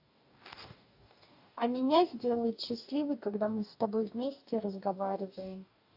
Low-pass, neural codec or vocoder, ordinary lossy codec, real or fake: 5.4 kHz; codec, 44.1 kHz, 2.6 kbps, DAC; AAC, 48 kbps; fake